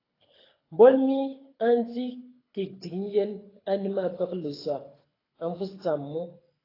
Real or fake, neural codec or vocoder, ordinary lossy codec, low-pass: fake; codec, 24 kHz, 6 kbps, HILCodec; AAC, 24 kbps; 5.4 kHz